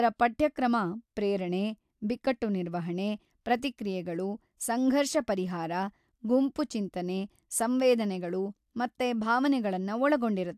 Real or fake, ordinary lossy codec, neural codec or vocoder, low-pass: real; AAC, 96 kbps; none; 14.4 kHz